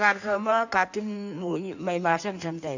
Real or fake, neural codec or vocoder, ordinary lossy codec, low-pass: fake; codec, 16 kHz in and 24 kHz out, 1.1 kbps, FireRedTTS-2 codec; none; 7.2 kHz